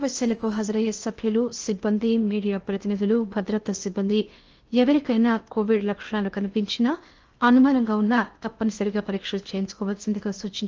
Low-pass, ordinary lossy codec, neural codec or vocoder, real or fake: 7.2 kHz; Opus, 24 kbps; codec, 16 kHz in and 24 kHz out, 0.8 kbps, FocalCodec, streaming, 65536 codes; fake